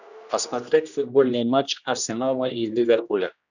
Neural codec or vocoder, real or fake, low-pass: codec, 16 kHz, 1 kbps, X-Codec, HuBERT features, trained on general audio; fake; 7.2 kHz